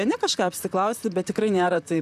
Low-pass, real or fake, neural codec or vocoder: 14.4 kHz; fake; vocoder, 44.1 kHz, 128 mel bands, Pupu-Vocoder